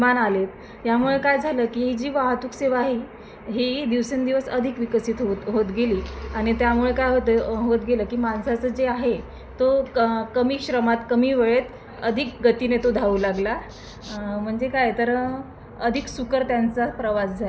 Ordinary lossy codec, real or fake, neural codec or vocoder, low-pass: none; real; none; none